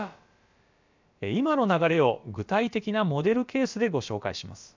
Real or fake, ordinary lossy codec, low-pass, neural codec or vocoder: fake; MP3, 64 kbps; 7.2 kHz; codec, 16 kHz, about 1 kbps, DyCAST, with the encoder's durations